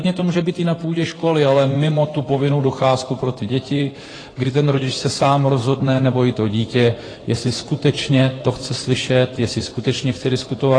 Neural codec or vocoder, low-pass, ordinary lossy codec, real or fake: codec, 16 kHz in and 24 kHz out, 2.2 kbps, FireRedTTS-2 codec; 9.9 kHz; AAC, 32 kbps; fake